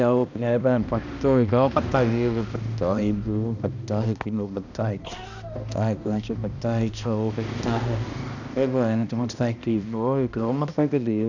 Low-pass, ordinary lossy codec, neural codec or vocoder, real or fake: 7.2 kHz; none; codec, 16 kHz, 1 kbps, X-Codec, HuBERT features, trained on balanced general audio; fake